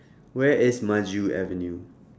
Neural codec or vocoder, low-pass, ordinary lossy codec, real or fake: none; none; none; real